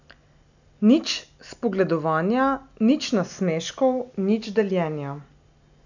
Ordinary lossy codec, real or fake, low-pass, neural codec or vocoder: none; real; 7.2 kHz; none